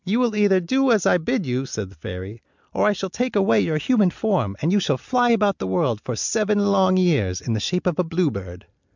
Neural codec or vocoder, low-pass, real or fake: none; 7.2 kHz; real